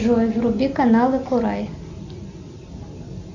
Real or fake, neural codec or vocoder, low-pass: real; none; 7.2 kHz